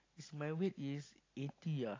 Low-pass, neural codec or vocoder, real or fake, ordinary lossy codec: 7.2 kHz; none; real; AAC, 32 kbps